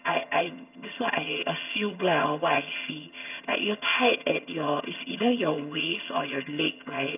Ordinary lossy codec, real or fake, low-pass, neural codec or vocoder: none; fake; 3.6 kHz; vocoder, 22.05 kHz, 80 mel bands, HiFi-GAN